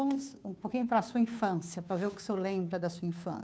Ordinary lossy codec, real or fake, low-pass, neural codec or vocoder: none; fake; none; codec, 16 kHz, 2 kbps, FunCodec, trained on Chinese and English, 25 frames a second